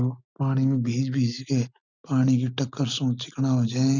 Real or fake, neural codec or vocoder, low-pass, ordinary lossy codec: real; none; 7.2 kHz; none